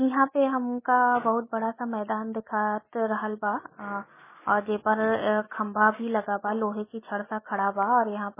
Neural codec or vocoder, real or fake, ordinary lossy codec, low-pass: none; real; MP3, 16 kbps; 3.6 kHz